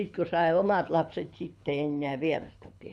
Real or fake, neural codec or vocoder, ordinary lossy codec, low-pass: fake; codec, 24 kHz, 6 kbps, HILCodec; none; none